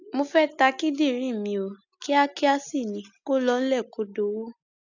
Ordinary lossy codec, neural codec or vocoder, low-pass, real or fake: none; none; 7.2 kHz; real